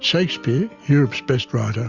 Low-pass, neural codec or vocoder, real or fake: 7.2 kHz; none; real